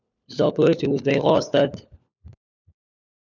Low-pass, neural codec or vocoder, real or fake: 7.2 kHz; codec, 16 kHz, 4 kbps, FunCodec, trained on LibriTTS, 50 frames a second; fake